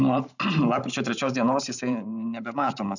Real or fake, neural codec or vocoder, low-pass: fake; vocoder, 44.1 kHz, 128 mel bands every 512 samples, BigVGAN v2; 7.2 kHz